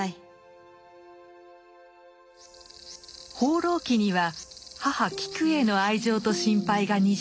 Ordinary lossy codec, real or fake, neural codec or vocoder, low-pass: none; real; none; none